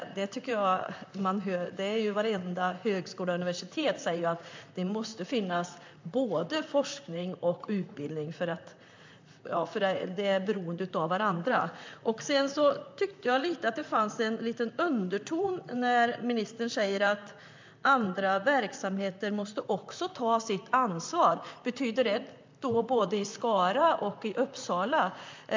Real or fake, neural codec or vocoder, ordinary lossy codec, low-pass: fake; vocoder, 44.1 kHz, 128 mel bands, Pupu-Vocoder; MP3, 64 kbps; 7.2 kHz